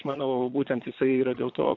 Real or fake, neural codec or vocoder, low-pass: fake; vocoder, 22.05 kHz, 80 mel bands, Vocos; 7.2 kHz